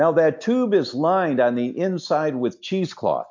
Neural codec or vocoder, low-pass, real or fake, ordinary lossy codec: none; 7.2 kHz; real; MP3, 48 kbps